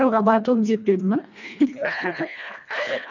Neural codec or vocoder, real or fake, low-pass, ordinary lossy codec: codec, 24 kHz, 1.5 kbps, HILCodec; fake; 7.2 kHz; none